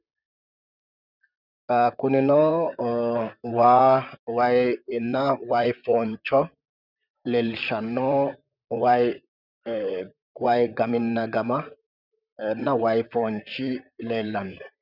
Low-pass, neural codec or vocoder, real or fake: 5.4 kHz; vocoder, 44.1 kHz, 128 mel bands, Pupu-Vocoder; fake